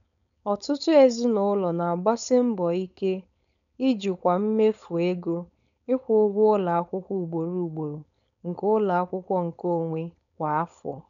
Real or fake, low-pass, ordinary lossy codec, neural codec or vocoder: fake; 7.2 kHz; none; codec, 16 kHz, 4.8 kbps, FACodec